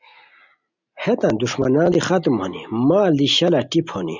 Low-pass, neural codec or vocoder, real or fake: 7.2 kHz; none; real